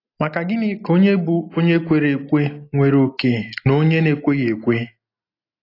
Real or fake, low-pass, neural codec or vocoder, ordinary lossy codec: real; 5.4 kHz; none; AAC, 32 kbps